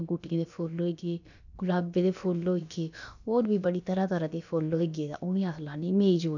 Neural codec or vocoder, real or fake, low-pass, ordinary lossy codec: codec, 16 kHz, about 1 kbps, DyCAST, with the encoder's durations; fake; 7.2 kHz; none